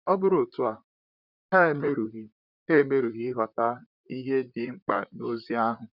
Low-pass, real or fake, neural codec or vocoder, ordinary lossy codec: 5.4 kHz; fake; vocoder, 44.1 kHz, 128 mel bands, Pupu-Vocoder; Opus, 64 kbps